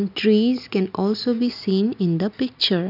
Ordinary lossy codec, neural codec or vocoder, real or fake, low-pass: none; none; real; 5.4 kHz